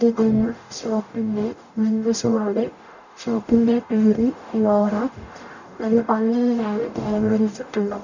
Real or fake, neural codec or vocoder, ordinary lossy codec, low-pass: fake; codec, 44.1 kHz, 0.9 kbps, DAC; none; 7.2 kHz